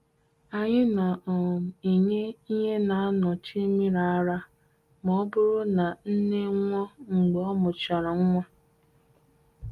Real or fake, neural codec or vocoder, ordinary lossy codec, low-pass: real; none; Opus, 32 kbps; 14.4 kHz